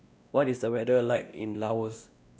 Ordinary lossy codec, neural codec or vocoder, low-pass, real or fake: none; codec, 16 kHz, 1 kbps, X-Codec, WavLM features, trained on Multilingual LibriSpeech; none; fake